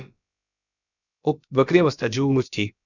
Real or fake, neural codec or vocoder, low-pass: fake; codec, 16 kHz, about 1 kbps, DyCAST, with the encoder's durations; 7.2 kHz